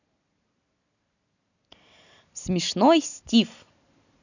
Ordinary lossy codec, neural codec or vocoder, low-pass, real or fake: none; none; 7.2 kHz; real